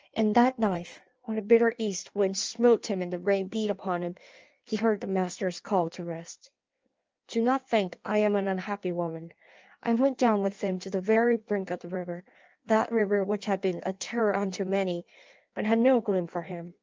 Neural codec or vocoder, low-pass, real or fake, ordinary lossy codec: codec, 16 kHz in and 24 kHz out, 1.1 kbps, FireRedTTS-2 codec; 7.2 kHz; fake; Opus, 24 kbps